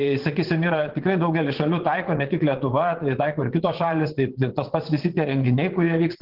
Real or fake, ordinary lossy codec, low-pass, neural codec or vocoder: fake; Opus, 16 kbps; 5.4 kHz; vocoder, 22.05 kHz, 80 mel bands, WaveNeXt